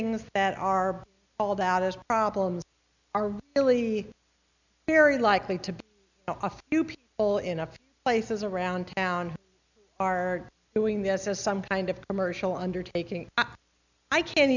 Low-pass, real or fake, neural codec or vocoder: 7.2 kHz; real; none